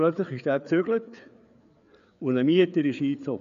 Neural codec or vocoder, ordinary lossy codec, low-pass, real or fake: codec, 16 kHz, 4 kbps, FreqCodec, larger model; none; 7.2 kHz; fake